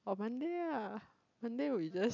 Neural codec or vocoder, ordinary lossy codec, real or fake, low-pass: none; none; real; 7.2 kHz